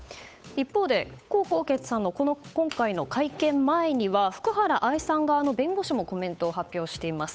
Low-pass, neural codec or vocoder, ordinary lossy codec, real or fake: none; codec, 16 kHz, 8 kbps, FunCodec, trained on Chinese and English, 25 frames a second; none; fake